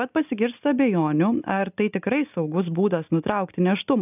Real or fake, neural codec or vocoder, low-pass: real; none; 3.6 kHz